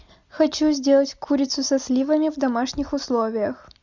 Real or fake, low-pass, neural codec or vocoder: real; 7.2 kHz; none